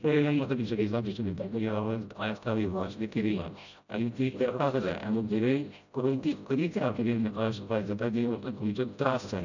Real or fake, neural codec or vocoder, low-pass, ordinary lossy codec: fake; codec, 16 kHz, 0.5 kbps, FreqCodec, smaller model; 7.2 kHz; none